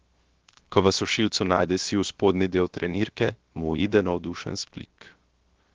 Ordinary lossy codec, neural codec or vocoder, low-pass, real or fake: Opus, 16 kbps; codec, 16 kHz, 0.7 kbps, FocalCodec; 7.2 kHz; fake